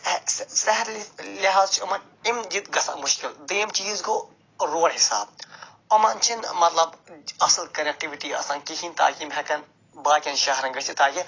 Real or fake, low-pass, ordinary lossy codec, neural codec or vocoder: real; 7.2 kHz; AAC, 32 kbps; none